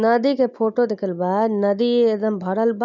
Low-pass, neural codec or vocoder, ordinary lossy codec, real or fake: 7.2 kHz; none; none; real